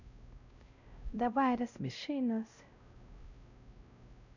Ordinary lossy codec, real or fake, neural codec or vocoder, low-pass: none; fake; codec, 16 kHz, 0.5 kbps, X-Codec, WavLM features, trained on Multilingual LibriSpeech; 7.2 kHz